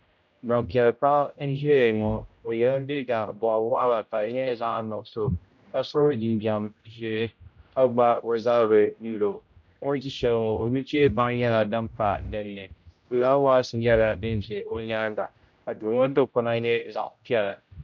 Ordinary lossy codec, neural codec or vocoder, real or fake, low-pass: MP3, 64 kbps; codec, 16 kHz, 0.5 kbps, X-Codec, HuBERT features, trained on general audio; fake; 7.2 kHz